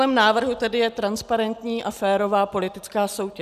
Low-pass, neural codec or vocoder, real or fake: 14.4 kHz; none; real